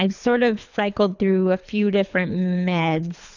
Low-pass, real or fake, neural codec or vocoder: 7.2 kHz; fake; codec, 24 kHz, 3 kbps, HILCodec